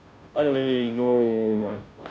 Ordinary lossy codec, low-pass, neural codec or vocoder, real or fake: none; none; codec, 16 kHz, 0.5 kbps, FunCodec, trained on Chinese and English, 25 frames a second; fake